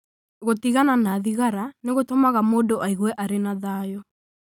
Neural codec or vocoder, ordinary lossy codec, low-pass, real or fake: none; none; 19.8 kHz; real